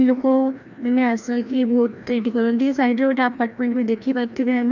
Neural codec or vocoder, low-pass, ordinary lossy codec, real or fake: codec, 16 kHz, 1 kbps, FreqCodec, larger model; 7.2 kHz; none; fake